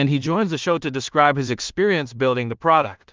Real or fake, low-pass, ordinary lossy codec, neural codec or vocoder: fake; 7.2 kHz; Opus, 24 kbps; codec, 16 kHz in and 24 kHz out, 0.4 kbps, LongCat-Audio-Codec, two codebook decoder